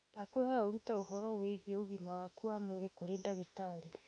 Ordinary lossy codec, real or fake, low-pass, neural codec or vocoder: none; fake; 9.9 kHz; autoencoder, 48 kHz, 32 numbers a frame, DAC-VAE, trained on Japanese speech